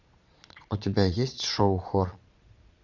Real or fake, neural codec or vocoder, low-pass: fake; vocoder, 22.05 kHz, 80 mel bands, Vocos; 7.2 kHz